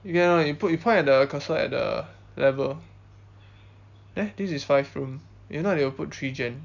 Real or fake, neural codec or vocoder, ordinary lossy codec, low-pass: real; none; none; 7.2 kHz